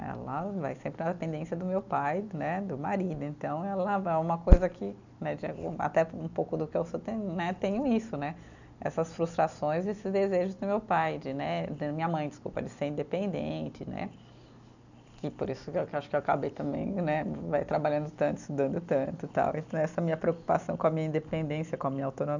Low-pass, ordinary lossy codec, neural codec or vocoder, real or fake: 7.2 kHz; none; none; real